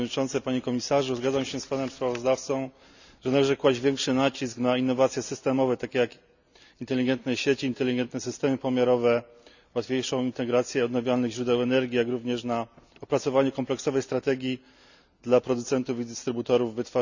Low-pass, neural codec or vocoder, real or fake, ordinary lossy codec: 7.2 kHz; none; real; none